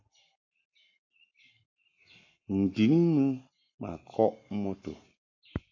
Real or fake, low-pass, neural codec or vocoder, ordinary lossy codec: fake; 7.2 kHz; codec, 44.1 kHz, 7.8 kbps, Pupu-Codec; AAC, 48 kbps